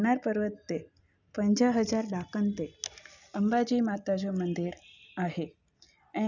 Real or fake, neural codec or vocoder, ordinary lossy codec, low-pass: real; none; none; 7.2 kHz